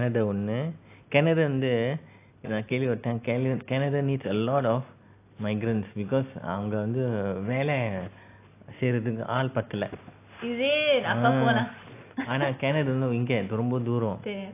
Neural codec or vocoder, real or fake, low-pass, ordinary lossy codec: none; real; 3.6 kHz; AAC, 24 kbps